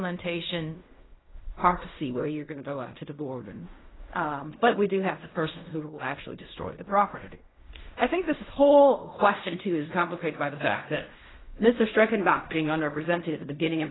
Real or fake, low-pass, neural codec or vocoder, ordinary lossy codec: fake; 7.2 kHz; codec, 16 kHz in and 24 kHz out, 0.4 kbps, LongCat-Audio-Codec, fine tuned four codebook decoder; AAC, 16 kbps